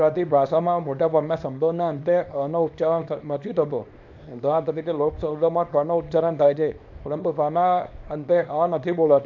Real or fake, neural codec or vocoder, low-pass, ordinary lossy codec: fake; codec, 24 kHz, 0.9 kbps, WavTokenizer, small release; 7.2 kHz; none